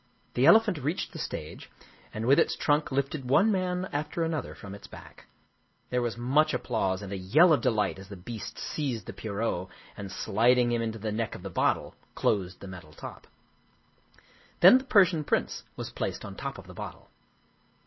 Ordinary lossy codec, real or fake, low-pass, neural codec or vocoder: MP3, 24 kbps; real; 7.2 kHz; none